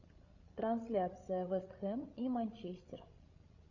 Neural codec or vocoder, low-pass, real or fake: codec, 16 kHz, 16 kbps, FreqCodec, larger model; 7.2 kHz; fake